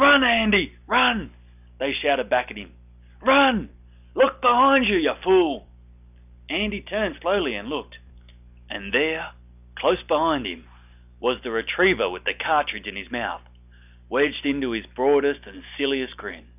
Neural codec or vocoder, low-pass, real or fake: none; 3.6 kHz; real